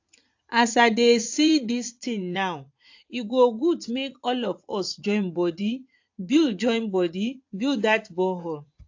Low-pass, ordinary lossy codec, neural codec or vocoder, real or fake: 7.2 kHz; AAC, 48 kbps; vocoder, 44.1 kHz, 80 mel bands, Vocos; fake